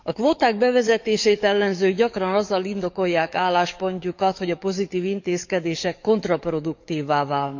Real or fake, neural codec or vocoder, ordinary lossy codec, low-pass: fake; codec, 44.1 kHz, 7.8 kbps, DAC; none; 7.2 kHz